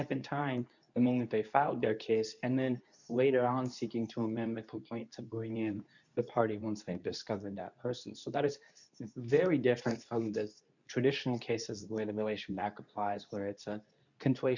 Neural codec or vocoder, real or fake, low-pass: codec, 24 kHz, 0.9 kbps, WavTokenizer, medium speech release version 2; fake; 7.2 kHz